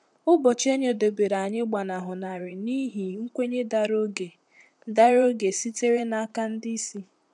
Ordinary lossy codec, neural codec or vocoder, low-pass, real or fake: none; vocoder, 44.1 kHz, 128 mel bands, Pupu-Vocoder; 10.8 kHz; fake